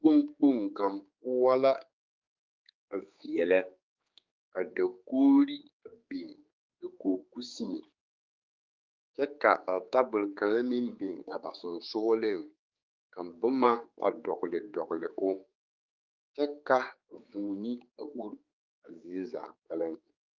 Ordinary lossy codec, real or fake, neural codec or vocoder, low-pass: Opus, 24 kbps; fake; codec, 16 kHz, 4 kbps, X-Codec, HuBERT features, trained on general audio; 7.2 kHz